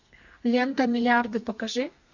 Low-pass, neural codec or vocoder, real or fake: 7.2 kHz; codec, 32 kHz, 1.9 kbps, SNAC; fake